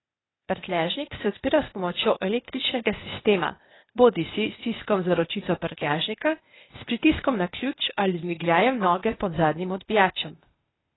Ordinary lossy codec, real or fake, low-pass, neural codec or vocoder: AAC, 16 kbps; fake; 7.2 kHz; codec, 16 kHz, 0.8 kbps, ZipCodec